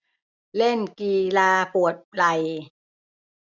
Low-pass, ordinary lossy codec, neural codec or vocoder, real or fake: 7.2 kHz; none; none; real